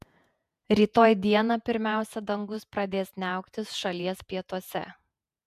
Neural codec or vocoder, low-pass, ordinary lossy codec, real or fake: vocoder, 48 kHz, 128 mel bands, Vocos; 14.4 kHz; MP3, 96 kbps; fake